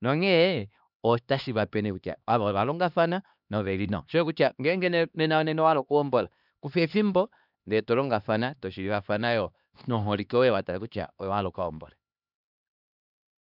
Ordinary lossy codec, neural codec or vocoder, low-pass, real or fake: none; codec, 16 kHz, 2 kbps, X-Codec, HuBERT features, trained on LibriSpeech; 5.4 kHz; fake